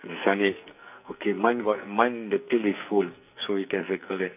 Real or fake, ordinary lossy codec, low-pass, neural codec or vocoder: fake; none; 3.6 kHz; codec, 44.1 kHz, 2.6 kbps, SNAC